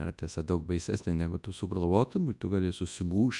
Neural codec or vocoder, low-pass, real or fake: codec, 24 kHz, 0.9 kbps, WavTokenizer, large speech release; 10.8 kHz; fake